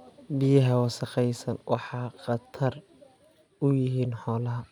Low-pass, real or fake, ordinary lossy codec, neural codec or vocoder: 19.8 kHz; real; none; none